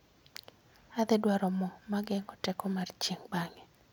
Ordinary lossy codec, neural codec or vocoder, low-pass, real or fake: none; none; none; real